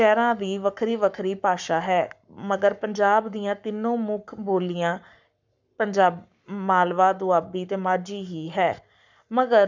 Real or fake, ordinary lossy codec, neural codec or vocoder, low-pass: fake; none; codec, 44.1 kHz, 7.8 kbps, Pupu-Codec; 7.2 kHz